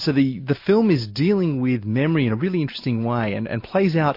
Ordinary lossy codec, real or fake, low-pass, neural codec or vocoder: MP3, 32 kbps; real; 5.4 kHz; none